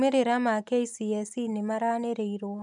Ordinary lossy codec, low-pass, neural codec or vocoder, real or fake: none; 10.8 kHz; none; real